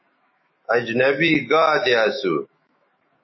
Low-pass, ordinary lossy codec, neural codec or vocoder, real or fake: 7.2 kHz; MP3, 24 kbps; autoencoder, 48 kHz, 128 numbers a frame, DAC-VAE, trained on Japanese speech; fake